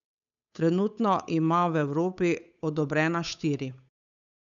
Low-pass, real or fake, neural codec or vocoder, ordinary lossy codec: 7.2 kHz; fake; codec, 16 kHz, 8 kbps, FunCodec, trained on Chinese and English, 25 frames a second; none